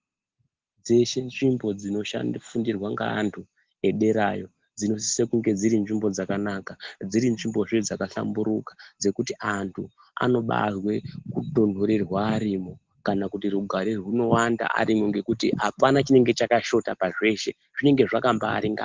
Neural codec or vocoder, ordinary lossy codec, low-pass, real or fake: none; Opus, 16 kbps; 7.2 kHz; real